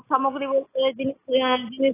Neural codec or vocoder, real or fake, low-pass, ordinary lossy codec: none; real; 3.6 kHz; AAC, 16 kbps